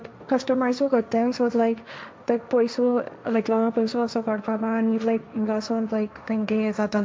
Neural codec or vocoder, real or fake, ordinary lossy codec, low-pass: codec, 16 kHz, 1.1 kbps, Voila-Tokenizer; fake; none; none